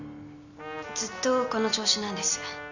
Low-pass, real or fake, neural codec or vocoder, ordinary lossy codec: 7.2 kHz; real; none; none